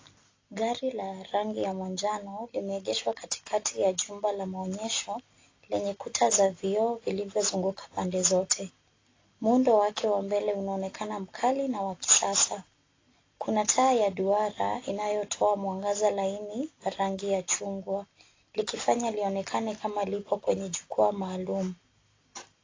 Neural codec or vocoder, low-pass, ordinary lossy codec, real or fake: none; 7.2 kHz; AAC, 32 kbps; real